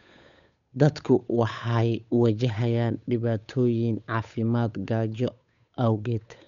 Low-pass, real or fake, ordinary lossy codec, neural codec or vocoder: 7.2 kHz; fake; MP3, 96 kbps; codec, 16 kHz, 8 kbps, FunCodec, trained on Chinese and English, 25 frames a second